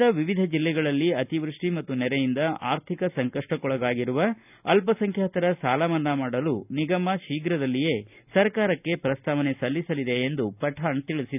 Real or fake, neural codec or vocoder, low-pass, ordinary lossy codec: real; none; 3.6 kHz; none